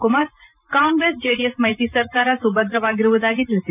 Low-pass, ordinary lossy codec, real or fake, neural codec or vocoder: 3.6 kHz; none; fake; vocoder, 44.1 kHz, 128 mel bands every 512 samples, BigVGAN v2